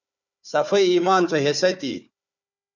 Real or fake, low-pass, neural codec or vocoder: fake; 7.2 kHz; codec, 16 kHz, 4 kbps, FunCodec, trained on Chinese and English, 50 frames a second